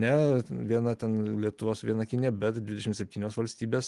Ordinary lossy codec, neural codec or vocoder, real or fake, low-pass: Opus, 24 kbps; none; real; 10.8 kHz